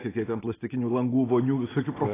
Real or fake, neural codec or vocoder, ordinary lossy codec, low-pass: real; none; AAC, 16 kbps; 3.6 kHz